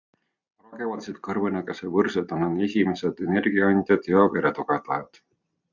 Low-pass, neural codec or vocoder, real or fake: 7.2 kHz; none; real